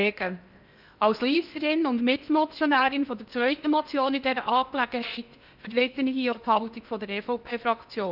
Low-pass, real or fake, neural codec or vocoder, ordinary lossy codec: 5.4 kHz; fake; codec, 16 kHz in and 24 kHz out, 0.8 kbps, FocalCodec, streaming, 65536 codes; none